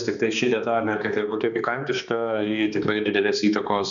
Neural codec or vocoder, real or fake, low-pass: codec, 16 kHz, 2 kbps, X-Codec, HuBERT features, trained on balanced general audio; fake; 7.2 kHz